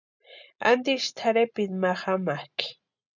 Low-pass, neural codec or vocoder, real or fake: 7.2 kHz; none; real